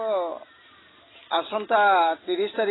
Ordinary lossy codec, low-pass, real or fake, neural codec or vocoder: AAC, 16 kbps; 7.2 kHz; real; none